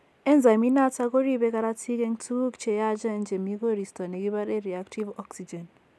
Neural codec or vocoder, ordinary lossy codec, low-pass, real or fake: none; none; none; real